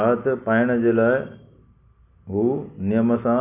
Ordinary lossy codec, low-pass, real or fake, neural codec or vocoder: none; 3.6 kHz; real; none